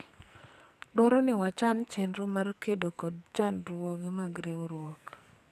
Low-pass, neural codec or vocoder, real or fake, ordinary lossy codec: 14.4 kHz; codec, 44.1 kHz, 2.6 kbps, SNAC; fake; none